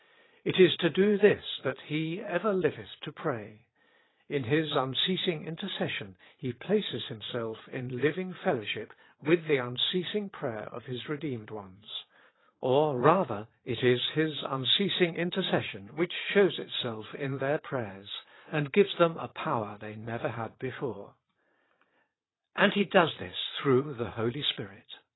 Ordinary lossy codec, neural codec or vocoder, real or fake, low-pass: AAC, 16 kbps; vocoder, 22.05 kHz, 80 mel bands, Vocos; fake; 7.2 kHz